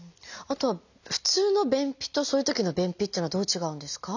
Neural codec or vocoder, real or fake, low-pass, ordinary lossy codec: none; real; 7.2 kHz; none